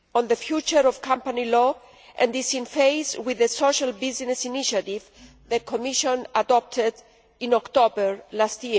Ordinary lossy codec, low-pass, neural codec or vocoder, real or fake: none; none; none; real